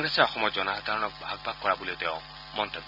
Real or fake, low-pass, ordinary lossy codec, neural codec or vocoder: real; 5.4 kHz; none; none